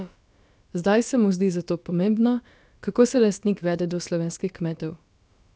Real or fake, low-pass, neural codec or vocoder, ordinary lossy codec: fake; none; codec, 16 kHz, about 1 kbps, DyCAST, with the encoder's durations; none